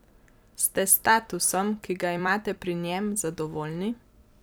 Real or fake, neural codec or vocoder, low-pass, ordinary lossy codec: fake; vocoder, 44.1 kHz, 128 mel bands every 256 samples, BigVGAN v2; none; none